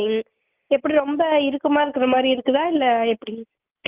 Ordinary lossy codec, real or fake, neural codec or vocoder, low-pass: Opus, 32 kbps; real; none; 3.6 kHz